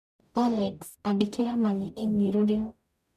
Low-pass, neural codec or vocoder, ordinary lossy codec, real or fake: 14.4 kHz; codec, 44.1 kHz, 0.9 kbps, DAC; none; fake